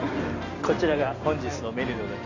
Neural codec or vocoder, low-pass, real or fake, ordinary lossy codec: none; 7.2 kHz; real; none